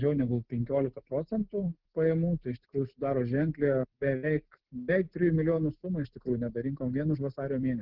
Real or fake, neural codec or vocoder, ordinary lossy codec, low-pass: real; none; Opus, 16 kbps; 5.4 kHz